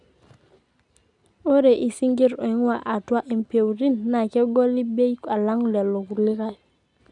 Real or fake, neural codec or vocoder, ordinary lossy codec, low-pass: real; none; none; 10.8 kHz